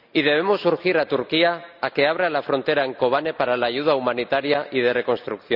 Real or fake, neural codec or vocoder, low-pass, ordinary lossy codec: real; none; 5.4 kHz; none